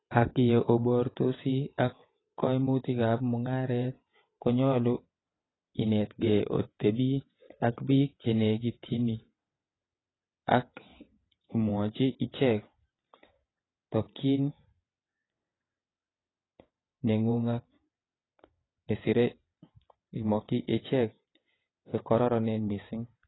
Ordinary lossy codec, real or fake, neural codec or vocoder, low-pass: AAC, 16 kbps; fake; vocoder, 22.05 kHz, 80 mel bands, WaveNeXt; 7.2 kHz